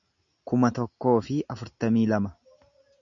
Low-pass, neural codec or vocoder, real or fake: 7.2 kHz; none; real